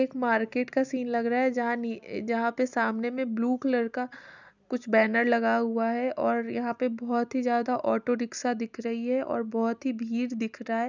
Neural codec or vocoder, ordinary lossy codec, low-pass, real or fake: none; none; 7.2 kHz; real